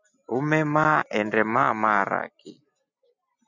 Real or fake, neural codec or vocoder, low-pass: real; none; 7.2 kHz